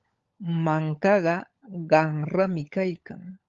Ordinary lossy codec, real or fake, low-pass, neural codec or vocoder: Opus, 32 kbps; fake; 7.2 kHz; codec, 16 kHz, 16 kbps, FunCodec, trained on LibriTTS, 50 frames a second